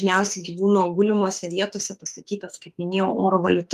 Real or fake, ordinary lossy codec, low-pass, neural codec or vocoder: fake; Opus, 32 kbps; 14.4 kHz; autoencoder, 48 kHz, 32 numbers a frame, DAC-VAE, trained on Japanese speech